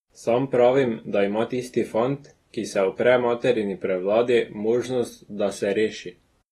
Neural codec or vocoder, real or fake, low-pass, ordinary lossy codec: none; real; 19.8 kHz; AAC, 32 kbps